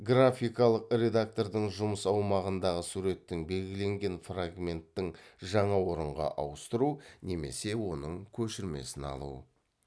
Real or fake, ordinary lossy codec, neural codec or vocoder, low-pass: real; none; none; none